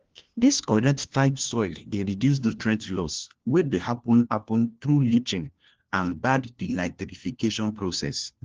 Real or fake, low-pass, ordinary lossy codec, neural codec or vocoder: fake; 7.2 kHz; Opus, 16 kbps; codec, 16 kHz, 1 kbps, FunCodec, trained on LibriTTS, 50 frames a second